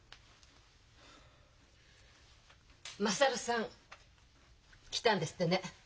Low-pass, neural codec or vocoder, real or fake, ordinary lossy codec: none; none; real; none